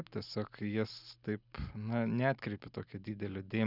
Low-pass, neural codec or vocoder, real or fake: 5.4 kHz; none; real